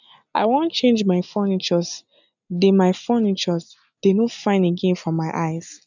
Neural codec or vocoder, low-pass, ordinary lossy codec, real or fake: none; 7.2 kHz; none; real